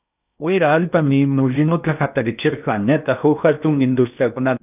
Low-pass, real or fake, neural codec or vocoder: 3.6 kHz; fake; codec, 16 kHz in and 24 kHz out, 0.8 kbps, FocalCodec, streaming, 65536 codes